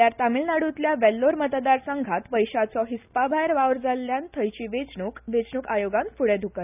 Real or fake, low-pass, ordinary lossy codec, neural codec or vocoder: real; 3.6 kHz; none; none